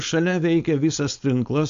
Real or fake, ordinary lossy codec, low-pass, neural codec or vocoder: fake; AAC, 64 kbps; 7.2 kHz; codec, 16 kHz, 4.8 kbps, FACodec